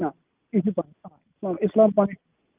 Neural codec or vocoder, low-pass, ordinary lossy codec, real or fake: none; 3.6 kHz; Opus, 24 kbps; real